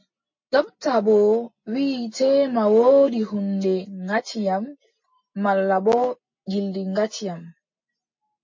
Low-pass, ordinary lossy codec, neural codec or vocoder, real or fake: 7.2 kHz; MP3, 32 kbps; none; real